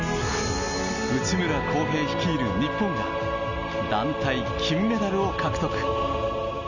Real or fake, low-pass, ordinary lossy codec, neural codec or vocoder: real; 7.2 kHz; none; none